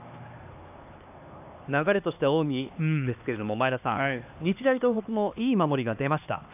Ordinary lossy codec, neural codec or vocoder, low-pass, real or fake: AAC, 32 kbps; codec, 16 kHz, 2 kbps, X-Codec, HuBERT features, trained on LibriSpeech; 3.6 kHz; fake